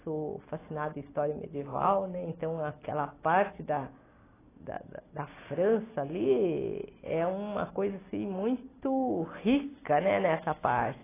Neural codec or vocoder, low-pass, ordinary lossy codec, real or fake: none; 3.6 kHz; AAC, 16 kbps; real